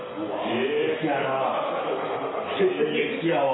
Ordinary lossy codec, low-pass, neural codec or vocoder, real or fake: AAC, 16 kbps; 7.2 kHz; none; real